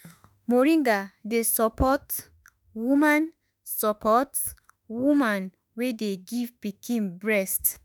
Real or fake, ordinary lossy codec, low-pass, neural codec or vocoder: fake; none; none; autoencoder, 48 kHz, 32 numbers a frame, DAC-VAE, trained on Japanese speech